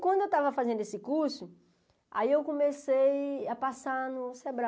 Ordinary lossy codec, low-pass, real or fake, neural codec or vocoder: none; none; real; none